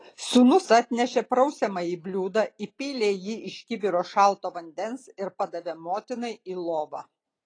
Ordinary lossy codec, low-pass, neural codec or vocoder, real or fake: AAC, 32 kbps; 9.9 kHz; none; real